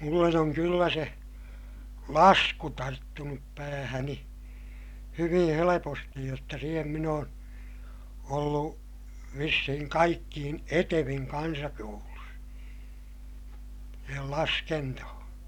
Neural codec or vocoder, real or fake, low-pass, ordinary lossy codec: none; real; 19.8 kHz; none